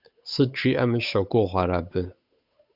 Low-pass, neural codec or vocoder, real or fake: 5.4 kHz; codec, 16 kHz, 8 kbps, FunCodec, trained on Chinese and English, 25 frames a second; fake